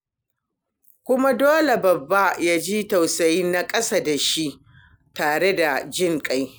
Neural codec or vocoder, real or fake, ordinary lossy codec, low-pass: none; real; none; none